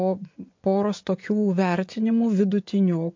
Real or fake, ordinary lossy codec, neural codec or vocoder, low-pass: fake; MP3, 48 kbps; vocoder, 24 kHz, 100 mel bands, Vocos; 7.2 kHz